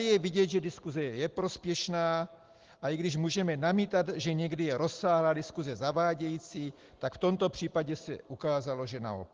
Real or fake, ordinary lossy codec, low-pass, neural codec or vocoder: real; Opus, 16 kbps; 7.2 kHz; none